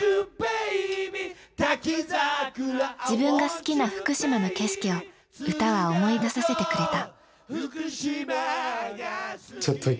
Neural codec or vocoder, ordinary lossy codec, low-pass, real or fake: none; none; none; real